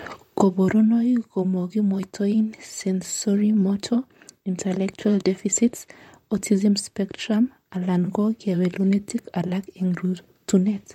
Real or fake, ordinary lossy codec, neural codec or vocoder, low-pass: fake; MP3, 64 kbps; vocoder, 44.1 kHz, 128 mel bands, Pupu-Vocoder; 19.8 kHz